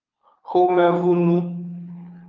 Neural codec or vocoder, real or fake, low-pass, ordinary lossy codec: codec, 24 kHz, 6 kbps, HILCodec; fake; 7.2 kHz; Opus, 24 kbps